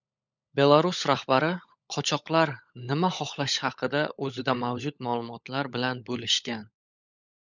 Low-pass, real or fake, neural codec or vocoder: 7.2 kHz; fake; codec, 16 kHz, 16 kbps, FunCodec, trained on LibriTTS, 50 frames a second